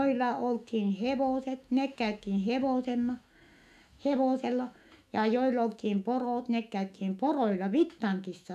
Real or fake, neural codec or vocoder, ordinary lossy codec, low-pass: fake; autoencoder, 48 kHz, 128 numbers a frame, DAC-VAE, trained on Japanese speech; none; 14.4 kHz